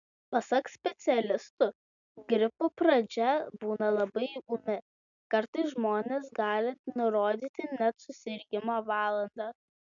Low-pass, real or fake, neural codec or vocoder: 7.2 kHz; real; none